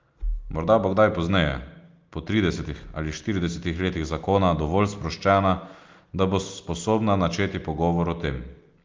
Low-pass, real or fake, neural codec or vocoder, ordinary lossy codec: 7.2 kHz; real; none; Opus, 32 kbps